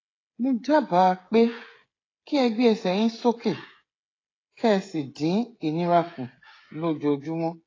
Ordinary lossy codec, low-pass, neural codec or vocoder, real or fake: AAC, 32 kbps; 7.2 kHz; codec, 16 kHz, 16 kbps, FreqCodec, smaller model; fake